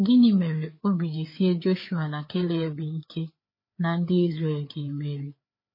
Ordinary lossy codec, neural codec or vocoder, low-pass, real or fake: MP3, 24 kbps; codec, 16 kHz, 4 kbps, FreqCodec, larger model; 5.4 kHz; fake